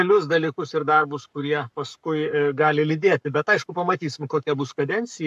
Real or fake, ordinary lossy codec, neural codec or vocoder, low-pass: fake; AAC, 96 kbps; codec, 44.1 kHz, 7.8 kbps, Pupu-Codec; 14.4 kHz